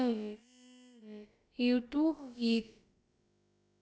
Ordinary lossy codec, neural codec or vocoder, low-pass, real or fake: none; codec, 16 kHz, about 1 kbps, DyCAST, with the encoder's durations; none; fake